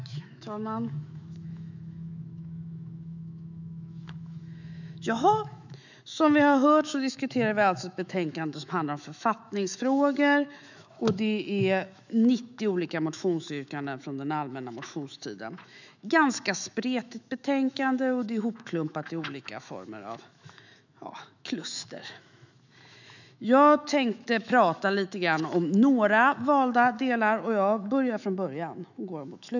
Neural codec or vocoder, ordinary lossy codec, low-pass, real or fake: autoencoder, 48 kHz, 128 numbers a frame, DAC-VAE, trained on Japanese speech; none; 7.2 kHz; fake